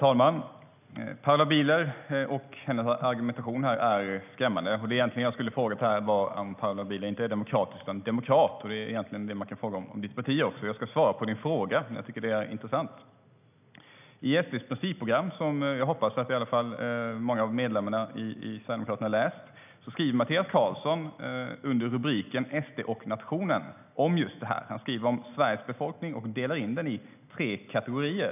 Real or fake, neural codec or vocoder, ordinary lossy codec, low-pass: real; none; none; 3.6 kHz